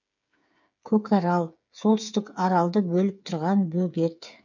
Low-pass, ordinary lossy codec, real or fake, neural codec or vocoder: 7.2 kHz; none; fake; codec, 16 kHz, 8 kbps, FreqCodec, smaller model